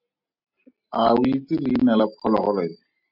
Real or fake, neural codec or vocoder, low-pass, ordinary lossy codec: real; none; 5.4 kHz; MP3, 48 kbps